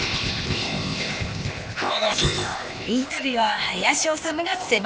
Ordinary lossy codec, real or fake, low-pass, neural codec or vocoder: none; fake; none; codec, 16 kHz, 0.8 kbps, ZipCodec